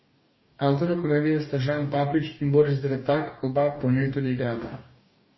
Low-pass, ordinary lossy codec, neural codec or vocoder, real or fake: 7.2 kHz; MP3, 24 kbps; codec, 44.1 kHz, 2.6 kbps, DAC; fake